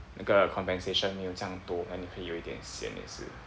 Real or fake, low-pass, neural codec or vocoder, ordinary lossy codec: real; none; none; none